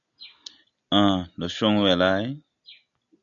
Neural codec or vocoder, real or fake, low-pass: none; real; 7.2 kHz